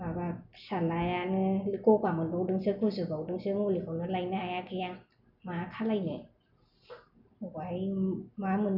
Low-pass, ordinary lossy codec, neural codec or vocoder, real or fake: 5.4 kHz; none; none; real